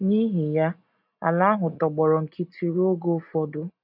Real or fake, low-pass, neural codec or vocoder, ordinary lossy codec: real; 5.4 kHz; none; none